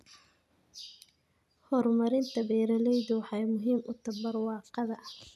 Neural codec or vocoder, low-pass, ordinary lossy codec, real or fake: none; 14.4 kHz; none; real